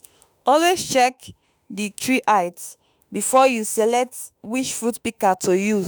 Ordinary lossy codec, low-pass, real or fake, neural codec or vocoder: none; none; fake; autoencoder, 48 kHz, 32 numbers a frame, DAC-VAE, trained on Japanese speech